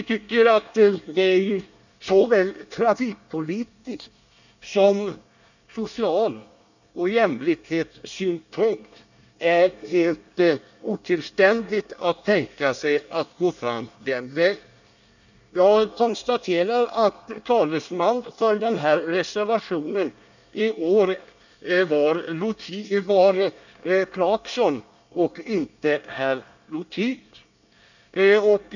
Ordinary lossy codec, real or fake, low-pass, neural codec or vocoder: none; fake; 7.2 kHz; codec, 24 kHz, 1 kbps, SNAC